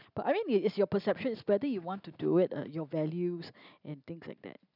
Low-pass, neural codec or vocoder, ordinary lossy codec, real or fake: 5.4 kHz; none; none; real